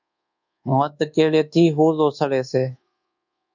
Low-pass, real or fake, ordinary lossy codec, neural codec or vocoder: 7.2 kHz; fake; MP3, 64 kbps; codec, 24 kHz, 1.2 kbps, DualCodec